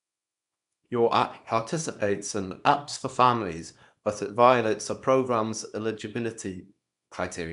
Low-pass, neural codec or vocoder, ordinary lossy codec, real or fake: 10.8 kHz; codec, 24 kHz, 0.9 kbps, WavTokenizer, small release; none; fake